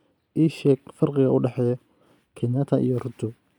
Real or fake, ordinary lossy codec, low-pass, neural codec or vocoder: real; none; 19.8 kHz; none